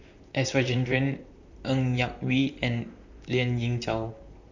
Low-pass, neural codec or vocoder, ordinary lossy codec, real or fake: 7.2 kHz; vocoder, 44.1 kHz, 128 mel bands, Pupu-Vocoder; none; fake